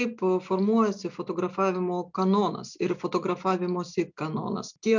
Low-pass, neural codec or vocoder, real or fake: 7.2 kHz; none; real